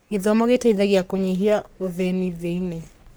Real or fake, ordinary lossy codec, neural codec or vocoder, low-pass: fake; none; codec, 44.1 kHz, 3.4 kbps, Pupu-Codec; none